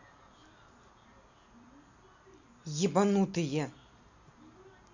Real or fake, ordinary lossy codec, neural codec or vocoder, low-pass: real; none; none; 7.2 kHz